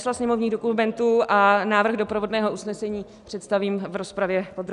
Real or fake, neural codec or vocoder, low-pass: real; none; 10.8 kHz